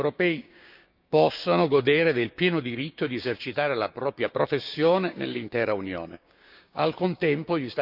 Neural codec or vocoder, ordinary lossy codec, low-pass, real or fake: codec, 16 kHz, 6 kbps, DAC; none; 5.4 kHz; fake